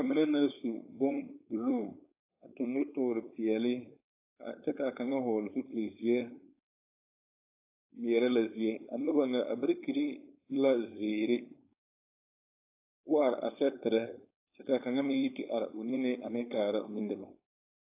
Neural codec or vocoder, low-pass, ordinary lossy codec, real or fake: codec, 16 kHz, 4.8 kbps, FACodec; 3.6 kHz; AAC, 24 kbps; fake